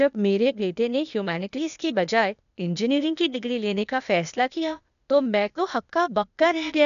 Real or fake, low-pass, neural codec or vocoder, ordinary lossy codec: fake; 7.2 kHz; codec, 16 kHz, 0.8 kbps, ZipCodec; none